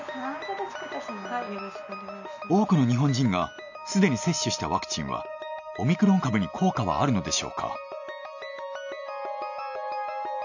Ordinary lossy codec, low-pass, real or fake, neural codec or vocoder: none; 7.2 kHz; real; none